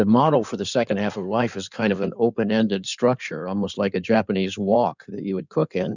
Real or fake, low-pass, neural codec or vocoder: fake; 7.2 kHz; codec, 16 kHz in and 24 kHz out, 2.2 kbps, FireRedTTS-2 codec